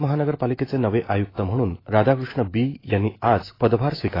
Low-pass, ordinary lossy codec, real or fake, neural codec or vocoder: 5.4 kHz; AAC, 24 kbps; fake; autoencoder, 48 kHz, 128 numbers a frame, DAC-VAE, trained on Japanese speech